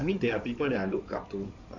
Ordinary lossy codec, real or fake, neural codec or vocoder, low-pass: none; fake; codec, 16 kHz in and 24 kHz out, 2.2 kbps, FireRedTTS-2 codec; 7.2 kHz